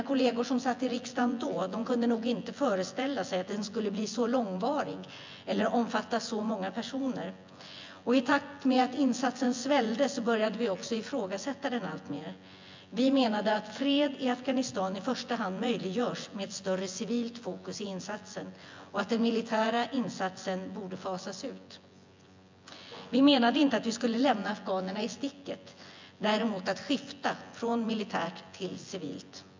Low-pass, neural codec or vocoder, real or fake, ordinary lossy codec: 7.2 kHz; vocoder, 24 kHz, 100 mel bands, Vocos; fake; MP3, 64 kbps